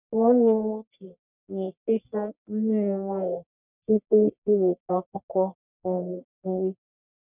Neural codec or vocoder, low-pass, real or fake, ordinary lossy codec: codec, 44.1 kHz, 1.7 kbps, Pupu-Codec; 3.6 kHz; fake; none